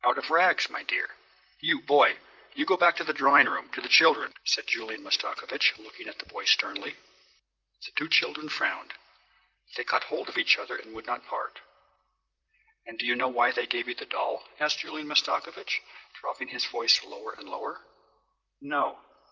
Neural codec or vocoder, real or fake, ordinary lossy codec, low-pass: vocoder, 44.1 kHz, 128 mel bands, Pupu-Vocoder; fake; Opus, 24 kbps; 7.2 kHz